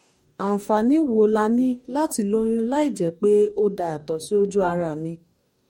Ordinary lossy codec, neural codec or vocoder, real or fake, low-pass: MP3, 64 kbps; codec, 44.1 kHz, 2.6 kbps, DAC; fake; 19.8 kHz